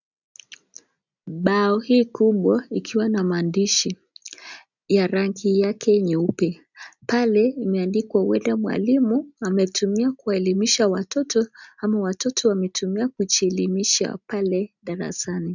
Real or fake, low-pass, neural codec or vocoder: real; 7.2 kHz; none